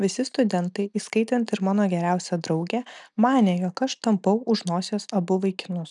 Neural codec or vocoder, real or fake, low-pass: none; real; 10.8 kHz